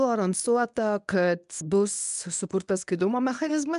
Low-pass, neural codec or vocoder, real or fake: 10.8 kHz; codec, 24 kHz, 0.9 kbps, WavTokenizer, medium speech release version 1; fake